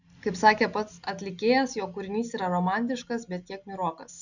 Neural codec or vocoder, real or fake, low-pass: none; real; 7.2 kHz